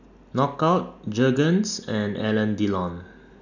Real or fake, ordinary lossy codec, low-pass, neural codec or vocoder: real; none; 7.2 kHz; none